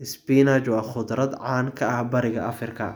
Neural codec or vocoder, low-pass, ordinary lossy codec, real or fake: none; none; none; real